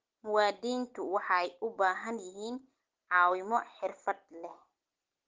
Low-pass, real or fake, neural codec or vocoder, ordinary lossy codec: 7.2 kHz; real; none; Opus, 16 kbps